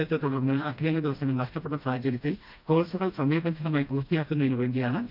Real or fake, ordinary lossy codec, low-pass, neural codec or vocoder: fake; none; 5.4 kHz; codec, 16 kHz, 1 kbps, FreqCodec, smaller model